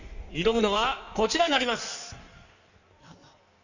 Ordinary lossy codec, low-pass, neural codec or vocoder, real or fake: none; 7.2 kHz; codec, 16 kHz in and 24 kHz out, 1.1 kbps, FireRedTTS-2 codec; fake